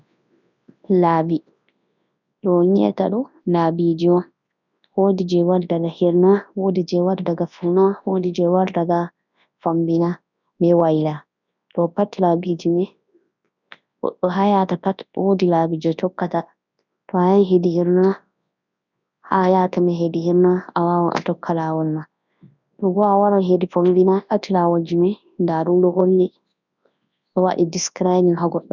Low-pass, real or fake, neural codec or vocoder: 7.2 kHz; fake; codec, 24 kHz, 0.9 kbps, WavTokenizer, large speech release